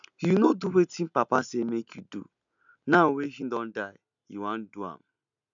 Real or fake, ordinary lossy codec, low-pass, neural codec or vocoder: real; MP3, 96 kbps; 7.2 kHz; none